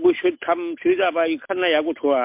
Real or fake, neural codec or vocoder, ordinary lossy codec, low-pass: real; none; MP3, 32 kbps; 3.6 kHz